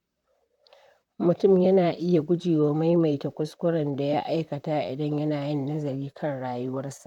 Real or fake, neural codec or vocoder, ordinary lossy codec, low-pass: fake; vocoder, 44.1 kHz, 128 mel bands, Pupu-Vocoder; none; 19.8 kHz